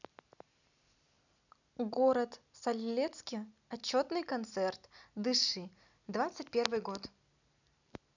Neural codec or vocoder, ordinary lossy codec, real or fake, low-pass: none; none; real; 7.2 kHz